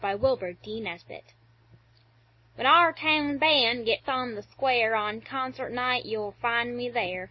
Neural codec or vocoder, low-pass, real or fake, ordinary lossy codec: none; 7.2 kHz; real; MP3, 24 kbps